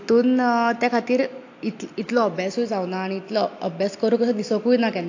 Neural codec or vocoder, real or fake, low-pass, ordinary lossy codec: none; real; 7.2 kHz; AAC, 48 kbps